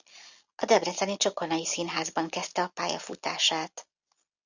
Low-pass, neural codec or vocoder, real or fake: 7.2 kHz; none; real